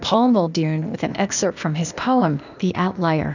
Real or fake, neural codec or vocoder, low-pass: fake; codec, 16 kHz, 0.8 kbps, ZipCodec; 7.2 kHz